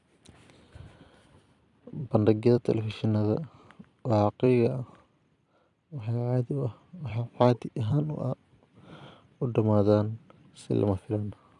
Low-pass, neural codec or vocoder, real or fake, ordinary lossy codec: 10.8 kHz; vocoder, 44.1 kHz, 128 mel bands every 256 samples, BigVGAN v2; fake; none